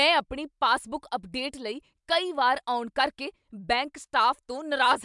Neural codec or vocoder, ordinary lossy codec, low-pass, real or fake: none; MP3, 96 kbps; 10.8 kHz; real